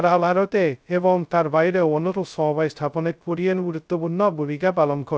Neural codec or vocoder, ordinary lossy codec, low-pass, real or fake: codec, 16 kHz, 0.2 kbps, FocalCodec; none; none; fake